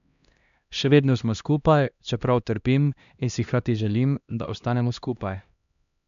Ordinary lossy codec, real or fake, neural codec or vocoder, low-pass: none; fake; codec, 16 kHz, 1 kbps, X-Codec, HuBERT features, trained on LibriSpeech; 7.2 kHz